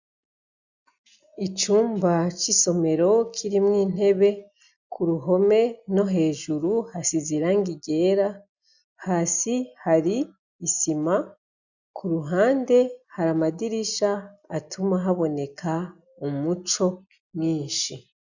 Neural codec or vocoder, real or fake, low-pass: none; real; 7.2 kHz